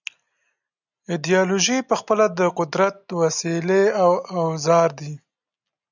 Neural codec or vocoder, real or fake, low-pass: none; real; 7.2 kHz